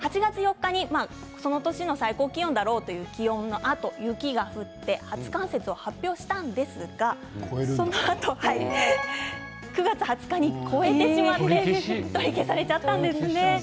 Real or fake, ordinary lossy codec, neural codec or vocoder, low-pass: real; none; none; none